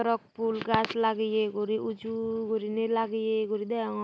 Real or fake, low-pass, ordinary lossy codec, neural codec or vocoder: real; none; none; none